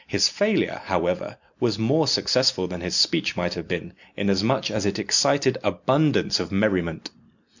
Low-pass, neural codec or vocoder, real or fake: 7.2 kHz; none; real